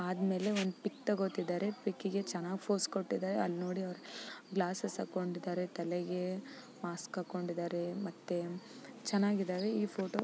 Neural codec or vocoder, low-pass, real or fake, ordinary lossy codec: none; none; real; none